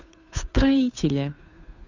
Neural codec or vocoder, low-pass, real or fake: codec, 16 kHz, 2 kbps, FunCodec, trained on Chinese and English, 25 frames a second; 7.2 kHz; fake